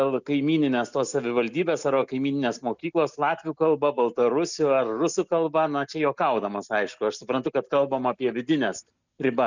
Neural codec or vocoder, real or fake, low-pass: none; real; 7.2 kHz